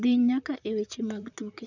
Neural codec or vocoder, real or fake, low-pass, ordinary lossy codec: vocoder, 44.1 kHz, 128 mel bands, Pupu-Vocoder; fake; 7.2 kHz; none